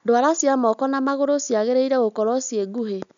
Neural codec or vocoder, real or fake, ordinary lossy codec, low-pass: none; real; none; 7.2 kHz